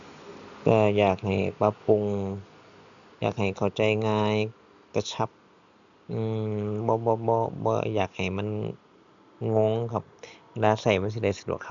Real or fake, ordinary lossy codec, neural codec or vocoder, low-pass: real; none; none; 7.2 kHz